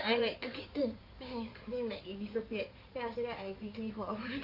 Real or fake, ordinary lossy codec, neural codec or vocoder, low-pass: fake; none; codec, 16 kHz in and 24 kHz out, 2.2 kbps, FireRedTTS-2 codec; 5.4 kHz